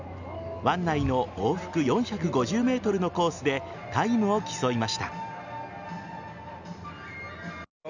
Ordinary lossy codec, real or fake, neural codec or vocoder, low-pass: none; real; none; 7.2 kHz